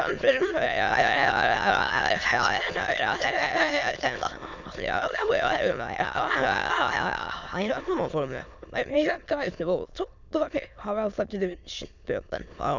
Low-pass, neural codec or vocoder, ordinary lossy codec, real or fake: 7.2 kHz; autoencoder, 22.05 kHz, a latent of 192 numbers a frame, VITS, trained on many speakers; none; fake